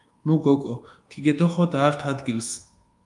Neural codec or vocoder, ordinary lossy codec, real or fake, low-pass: codec, 24 kHz, 1.2 kbps, DualCodec; Opus, 32 kbps; fake; 10.8 kHz